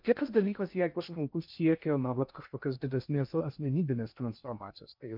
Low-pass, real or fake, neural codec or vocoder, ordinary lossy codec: 5.4 kHz; fake; codec, 16 kHz in and 24 kHz out, 0.6 kbps, FocalCodec, streaming, 2048 codes; MP3, 48 kbps